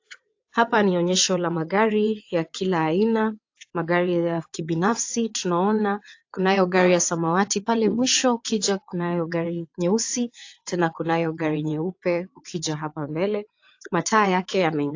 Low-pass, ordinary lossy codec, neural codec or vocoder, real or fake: 7.2 kHz; AAC, 48 kbps; vocoder, 22.05 kHz, 80 mel bands, WaveNeXt; fake